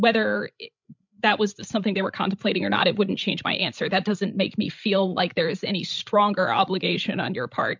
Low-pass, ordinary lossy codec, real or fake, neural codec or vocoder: 7.2 kHz; MP3, 64 kbps; fake; codec, 16 kHz, 16 kbps, FreqCodec, larger model